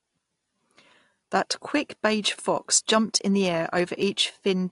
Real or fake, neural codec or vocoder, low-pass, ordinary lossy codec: real; none; 10.8 kHz; AAC, 48 kbps